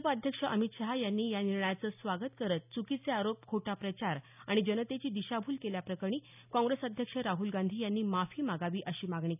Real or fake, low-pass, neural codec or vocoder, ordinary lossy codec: real; 3.6 kHz; none; none